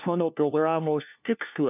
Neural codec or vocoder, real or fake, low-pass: codec, 16 kHz, 1 kbps, FunCodec, trained on LibriTTS, 50 frames a second; fake; 3.6 kHz